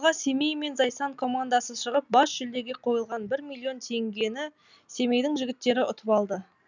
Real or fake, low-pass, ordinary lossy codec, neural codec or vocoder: real; 7.2 kHz; none; none